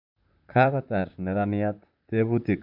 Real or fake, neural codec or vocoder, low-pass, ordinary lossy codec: fake; vocoder, 44.1 kHz, 128 mel bands, Pupu-Vocoder; 5.4 kHz; none